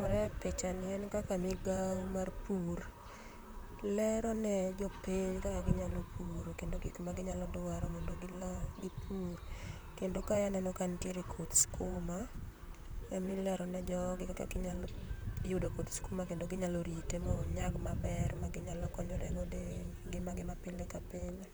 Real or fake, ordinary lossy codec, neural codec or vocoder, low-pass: fake; none; vocoder, 44.1 kHz, 128 mel bands, Pupu-Vocoder; none